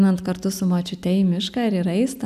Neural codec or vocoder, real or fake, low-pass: none; real; 14.4 kHz